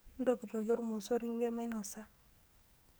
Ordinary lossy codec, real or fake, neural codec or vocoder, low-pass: none; fake; codec, 44.1 kHz, 2.6 kbps, SNAC; none